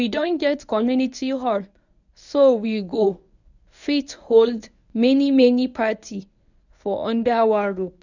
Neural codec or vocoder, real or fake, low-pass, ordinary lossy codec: codec, 24 kHz, 0.9 kbps, WavTokenizer, medium speech release version 1; fake; 7.2 kHz; none